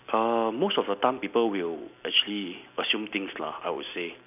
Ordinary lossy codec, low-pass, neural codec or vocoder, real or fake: none; 3.6 kHz; none; real